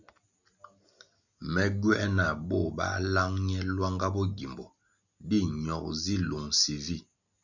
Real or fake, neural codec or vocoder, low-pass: real; none; 7.2 kHz